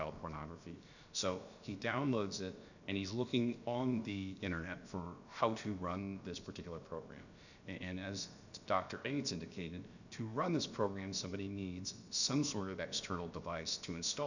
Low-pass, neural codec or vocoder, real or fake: 7.2 kHz; codec, 16 kHz, about 1 kbps, DyCAST, with the encoder's durations; fake